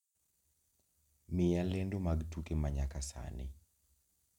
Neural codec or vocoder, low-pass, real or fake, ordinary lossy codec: none; 19.8 kHz; real; none